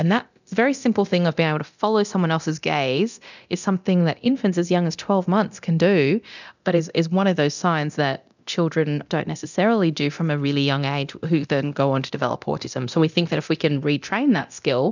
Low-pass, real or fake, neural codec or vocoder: 7.2 kHz; fake; codec, 24 kHz, 0.9 kbps, DualCodec